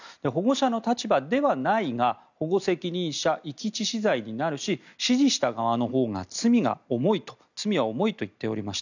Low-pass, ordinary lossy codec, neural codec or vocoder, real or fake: 7.2 kHz; none; none; real